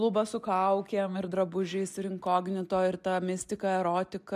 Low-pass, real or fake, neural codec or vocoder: 14.4 kHz; real; none